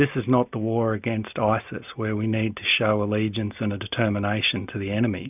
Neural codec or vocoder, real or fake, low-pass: none; real; 3.6 kHz